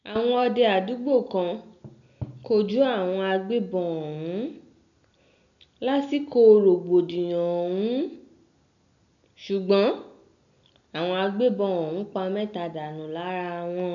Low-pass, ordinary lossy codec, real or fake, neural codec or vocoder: 7.2 kHz; none; real; none